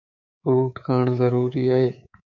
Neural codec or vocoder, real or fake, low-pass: codec, 24 kHz, 3.1 kbps, DualCodec; fake; 7.2 kHz